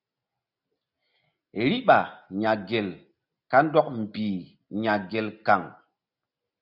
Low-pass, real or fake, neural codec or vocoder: 5.4 kHz; real; none